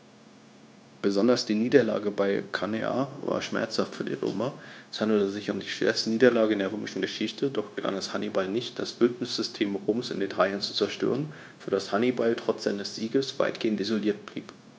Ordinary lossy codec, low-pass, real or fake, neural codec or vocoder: none; none; fake; codec, 16 kHz, 0.9 kbps, LongCat-Audio-Codec